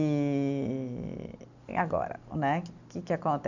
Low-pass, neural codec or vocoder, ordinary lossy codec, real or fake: 7.2 kHz; none; none; real